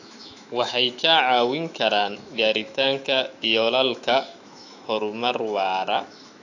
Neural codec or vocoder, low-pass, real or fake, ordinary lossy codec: autoencoder, 48 kHz, 128 numbers a frame, DAC-VAE, trained on Japanese speech; 7.2 kHz; fake; AAC, 48 kbps